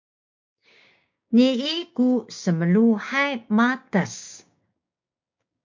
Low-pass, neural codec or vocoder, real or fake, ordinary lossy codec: 7.2 kHz; codec, 16 kHz, 6 kbps, DAC; fake; MP3, 48 kbps